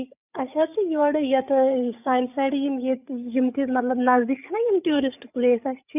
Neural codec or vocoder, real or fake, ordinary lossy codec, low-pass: codec, 16 kHz, 8 kbps, FreqCodec, larger model; fake; none; 3.6 kHz